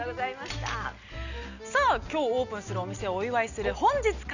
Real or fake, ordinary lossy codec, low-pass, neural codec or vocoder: real; none; 7.2 kHz; none